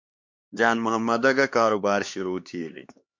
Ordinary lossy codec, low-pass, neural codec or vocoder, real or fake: MP3, 48 kbps; 7.2 kHz; codec, 16 kHz, 2 kbps, X-Codec, HuBERT features, trained on LibriSpeech; fake